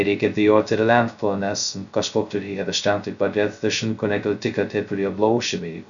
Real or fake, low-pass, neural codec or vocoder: fake; 7.2 kHz; codec, 16 kHz, 0.2 kbps, FocalCodec